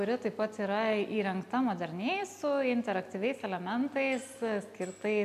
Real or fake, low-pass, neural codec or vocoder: real; 14.4 kHz; none